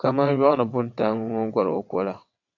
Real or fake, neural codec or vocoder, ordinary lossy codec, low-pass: fake; vocoder, 22.05 kHz, 80 mel bands, WaveNeXt; AAC, 48 kbps; 7.2 kHz